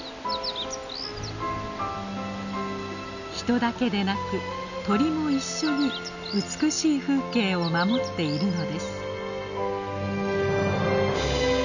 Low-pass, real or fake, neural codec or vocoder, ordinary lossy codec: 7.2 kHz; real; none; none